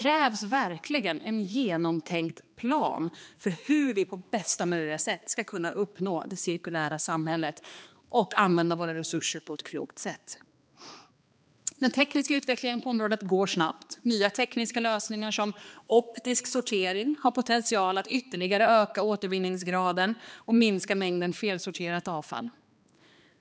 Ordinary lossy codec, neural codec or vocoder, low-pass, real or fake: none; codec, 16 kHz, 2 kbps, X-Codec, HuBERT features, trained on balanced general audio; none; fake